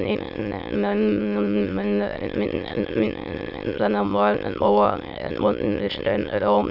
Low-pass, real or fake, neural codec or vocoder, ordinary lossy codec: 5.4 kHz; fake; autoencoder, 22.05 kHz, a latent of 192 numbers a frame, VITS, trained on many speakers; none